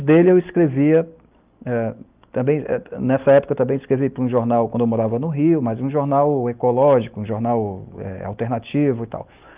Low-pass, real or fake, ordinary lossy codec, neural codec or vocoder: 3.6 kHz; real; Opus, 24 kbps; none